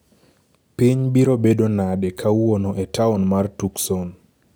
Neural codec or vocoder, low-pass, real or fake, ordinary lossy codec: none; none; real; none